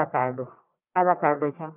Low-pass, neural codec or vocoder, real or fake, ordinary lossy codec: 3.6 kHz; codec, 44.1 kHz, 1.7 kbps, Pupu-Codec; fake; none